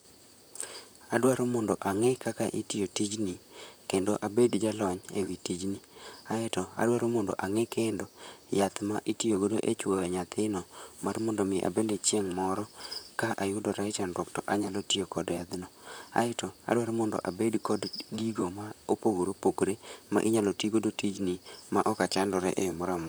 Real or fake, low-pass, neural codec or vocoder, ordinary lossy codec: fake; none; vocoder, 44.1 kHz, 128 mel bands, Pupu-Vocoder; none